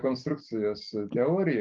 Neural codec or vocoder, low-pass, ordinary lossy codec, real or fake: none; 7.2 kHz; Opus, 32 kbps; real